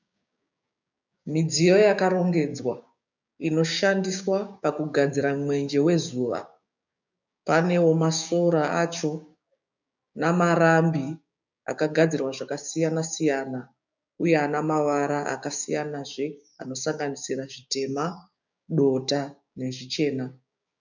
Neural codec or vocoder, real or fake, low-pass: codec, 16 kHz, 6 kbps, DAC; fake; 7.2 kHz